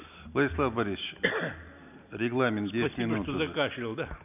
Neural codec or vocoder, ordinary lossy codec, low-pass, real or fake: none; none; 3.6 kHz; real